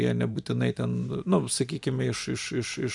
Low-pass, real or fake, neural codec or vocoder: 10.8 kHz; fake; vocoder, 44.1 kHz, 128 mel bands every 512 samples, BigVGAN v2